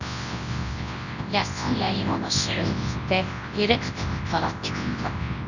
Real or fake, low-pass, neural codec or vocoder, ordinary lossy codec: fake; 7.2 kHz; codec, 24 kHz, 0.9 kbps, WavTokenizer, large speech release; none